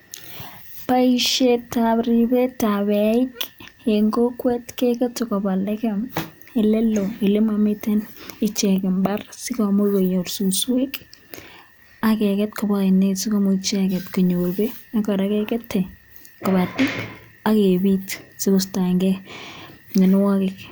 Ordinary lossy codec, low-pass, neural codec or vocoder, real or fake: none; none; none; real